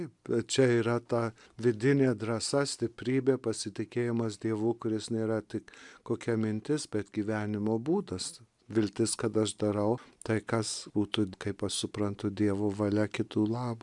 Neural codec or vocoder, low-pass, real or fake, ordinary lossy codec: none; 10.8 kHz; real; MP3, 96 kbps